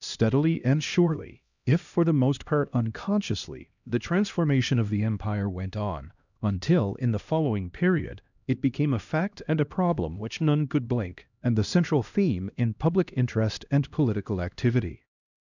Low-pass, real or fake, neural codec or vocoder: 7.2 kHz; fake; codec, 16 kHz, 1 kbps, X-Codec, HuBERT features, trained on LibriSpeech